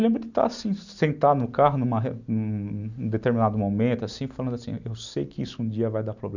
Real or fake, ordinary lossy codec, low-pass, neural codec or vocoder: real; none; 7.2 kHz; none